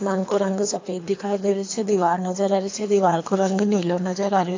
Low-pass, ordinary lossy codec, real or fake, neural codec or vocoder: 7.2 kHz; AAC, 48 kbps; fake; codec, 24 kHz, 3 kbps, HILCodec